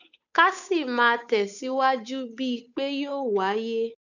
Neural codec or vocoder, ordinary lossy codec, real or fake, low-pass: codec, 16 kHz, 8 kbps, FunCodec, trained on Chinese and English, 25 frames a second; none; fake; 7.2 kHz